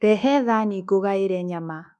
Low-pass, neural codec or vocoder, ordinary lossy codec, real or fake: none; codec, 24 kHz, 1.2 kbps, DualCodec; none; fake